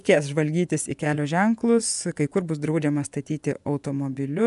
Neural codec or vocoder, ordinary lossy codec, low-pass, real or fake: vocoder, 24 kHz, 100 mel bands, Vocos; MP3, 96 kbps; 10.8 kHz; fake